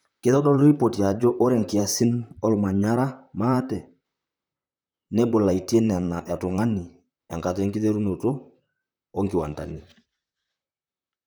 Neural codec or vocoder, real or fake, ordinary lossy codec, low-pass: vocoder, 44.1 kHz, 128 mel bands, Pupu-Vocoder; fake; none; none